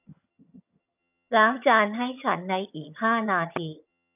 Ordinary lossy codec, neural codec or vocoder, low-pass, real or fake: none; vocoder, 22.05 kHz, 80 mel bands, HiFi-GAN; 3.6 kHz; fake